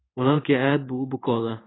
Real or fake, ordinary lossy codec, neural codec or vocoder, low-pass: fake; AAC, 16 kbps; codec, 16 kHz in and 24 kHz out, 1 kbps, XY-Tokenizer; 7.2 kHz